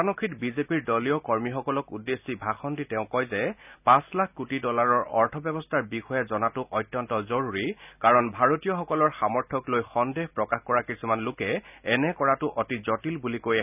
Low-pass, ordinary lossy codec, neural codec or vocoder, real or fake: 3.6 kHz; none; none; real